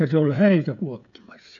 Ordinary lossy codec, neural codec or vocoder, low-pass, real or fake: none; codec, 16 kHz, 16 kbps, FreqCodec, smaller model; 7.2 kHz; fake